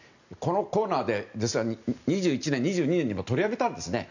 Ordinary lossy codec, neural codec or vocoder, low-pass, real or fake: none; none; 7.2 kHz; real